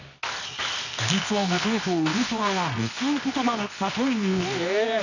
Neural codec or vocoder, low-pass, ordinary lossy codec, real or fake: codec, 24 kHz, 0.9 kbps, WavTokenizer, medium music audio release; 7.2 kHz; none; fake